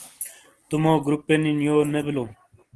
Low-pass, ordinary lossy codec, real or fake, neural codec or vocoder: 10.8 kHz; Opus, 16 kbps; real; none